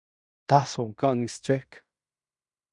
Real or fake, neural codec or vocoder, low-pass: fake; codec, 16 kHz in and 24 kHz out, 0.9 kbps, LongCat-Audio-Codec, fine tuned four codebook decoder; 10.8 kHz